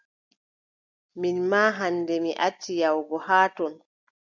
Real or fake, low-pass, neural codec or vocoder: real; 7.2 kHz; none